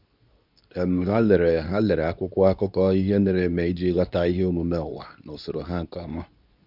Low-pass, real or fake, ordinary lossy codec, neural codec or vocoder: 5.4 kHz; fake; none; codec, 24 kHz, 0.9 kbps, WavTokenizer, medium speech release version 2